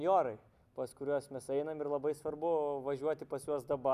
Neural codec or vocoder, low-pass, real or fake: none; 14.4 kHz; real